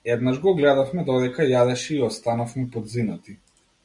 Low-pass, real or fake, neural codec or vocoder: 10.8 kHz; real; none